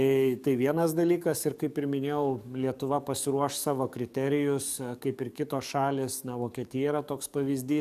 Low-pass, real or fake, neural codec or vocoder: 14.4 kHz; fake; codec, 44.1 kHz, 7.8 kbps, DAC